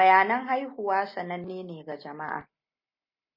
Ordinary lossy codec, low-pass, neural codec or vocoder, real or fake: MP3, 24 kbps; 5.4 kHz; none; real